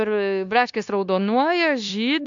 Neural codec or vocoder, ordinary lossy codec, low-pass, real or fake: codec, 16 kHz, 0.9 kbps, LongCat-Audio-Codec; AAC, 64 kbps; 7.2 kHz; fake